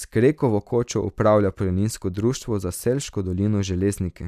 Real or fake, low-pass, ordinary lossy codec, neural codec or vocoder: real; 14.4 kHz; none; none